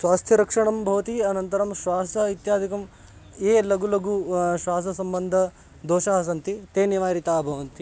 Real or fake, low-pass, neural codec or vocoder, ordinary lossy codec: real; none; none; none